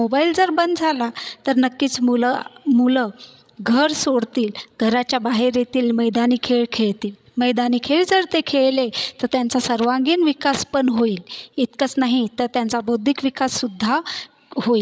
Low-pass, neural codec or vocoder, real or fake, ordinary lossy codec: none; codec, 16 kHz, 16 kbps, FreqCodec, larger model; fake; none